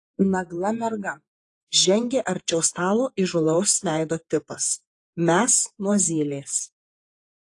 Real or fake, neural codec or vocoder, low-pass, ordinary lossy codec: fake; vocoder, 48 kHz, 128 mel bands, Vocos; 10.8 kHz; AAC, 48 kbps